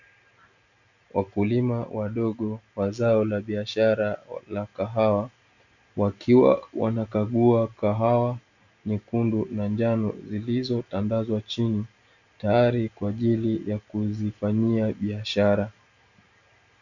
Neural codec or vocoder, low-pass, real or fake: none; 7.2 kHz; real